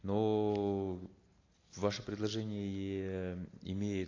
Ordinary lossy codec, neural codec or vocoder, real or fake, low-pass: AAC, 32 kbps; none; real; 7.2 kHz